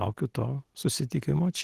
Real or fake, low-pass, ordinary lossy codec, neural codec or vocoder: real; 14.4 kHz; Opus, 16 kbps; none